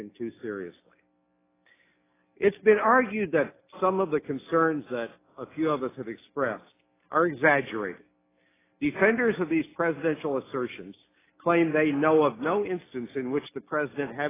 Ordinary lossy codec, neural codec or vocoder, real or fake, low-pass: AAC, 16 kbps; none; real; 3.6 kHz